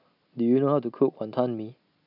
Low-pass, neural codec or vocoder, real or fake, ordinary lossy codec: 5.4 kHz; none; real; none